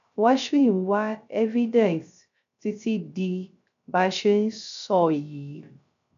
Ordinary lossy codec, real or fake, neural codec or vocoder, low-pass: none; fake; codec, 16 kHz, 0.3 kbps, FocalCodec; 7.2 kHz